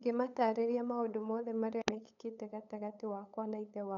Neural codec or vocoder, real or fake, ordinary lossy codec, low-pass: codec, 16 kHz, 8 kbps, FunCodec, trained on LibriTTS, 25 frames a second; fake; none; 7.2 kHz